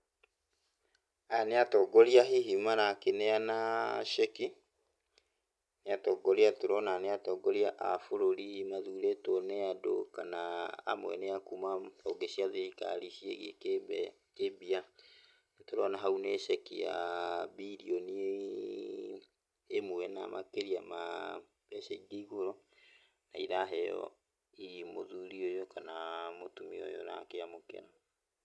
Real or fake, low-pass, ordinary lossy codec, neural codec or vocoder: real; none; none; none